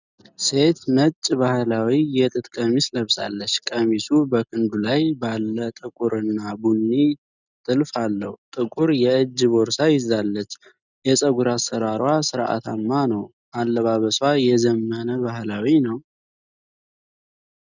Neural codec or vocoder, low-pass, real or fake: none; 7.2 kHz; real